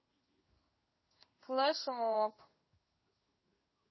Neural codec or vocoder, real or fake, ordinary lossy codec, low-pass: codec, 16 kHz in and 24 kHz out, 1 kbps, XY-Tokenizer; fake; MP3, 24 kbps; 7.2 kHz